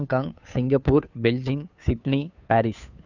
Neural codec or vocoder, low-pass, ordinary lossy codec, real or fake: codec, 16 kHz, 2 kbps, FunCodec, trained on Chinese and English, 25 frames a second; 7.2 kHz; none; fake